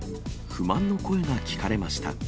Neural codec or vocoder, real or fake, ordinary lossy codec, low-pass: none; real; none; none